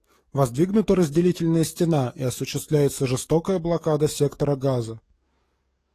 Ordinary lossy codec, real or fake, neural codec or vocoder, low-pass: AAC, 48 kbps; fake; codec, 44.1 kHz, 7.8 kbps, DAC; 14.4 kHz